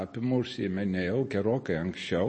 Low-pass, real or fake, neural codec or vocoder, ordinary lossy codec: 9.9 kHz; real; none; MP3, 32 kbps